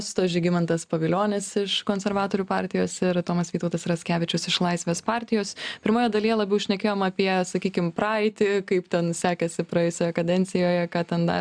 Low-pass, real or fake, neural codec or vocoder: 9.9 kHz; real; none